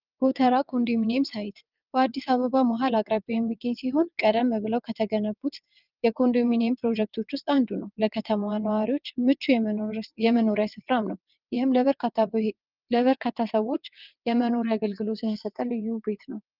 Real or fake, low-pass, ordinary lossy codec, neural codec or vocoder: fake; 5.4 kHz; Opus, 24 kbps; vocoder, 22.05 kHz, 80 mel bands, WaveNeXt